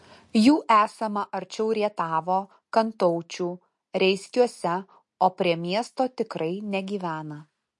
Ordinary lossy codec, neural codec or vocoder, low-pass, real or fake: MP3, 48 kbps; none; 10.8 kHz; real